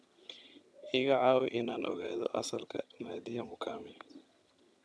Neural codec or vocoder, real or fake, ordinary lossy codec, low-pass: vocoder, 22.05 kHz, 80 mel bands, HiFi-GAN; fake; none; none